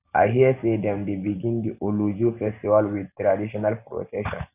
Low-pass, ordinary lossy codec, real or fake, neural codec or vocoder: 3.6 kHz; none; real; none